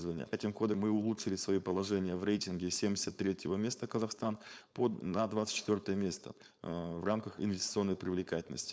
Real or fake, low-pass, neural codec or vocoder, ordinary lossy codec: fake; none; codec, 16 kHz, 4.8 kbps, FACodec; none